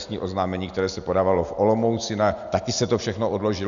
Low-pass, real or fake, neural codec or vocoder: 7.2 kHz; real; none